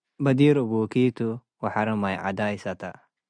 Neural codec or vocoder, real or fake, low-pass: none; real; 9.9 kHz